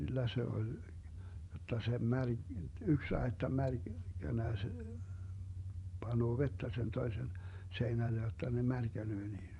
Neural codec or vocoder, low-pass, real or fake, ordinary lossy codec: vocoder, 24 kHz, 100 mel bands, Vocos; 10.8 kHz; fake; none